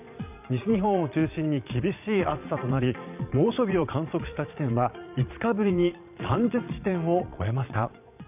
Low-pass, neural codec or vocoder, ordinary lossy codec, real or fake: 3.6 kHz; vocoder, 22.05 kHz, 80 mel bands, Vocos; none; fake